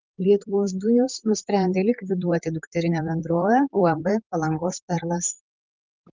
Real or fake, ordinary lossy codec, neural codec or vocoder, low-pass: fake; Opus, 32 kbps; vocoder, 44.1 kHz, 128 mel bands, Pupu-Vocoder; 7.2 kHz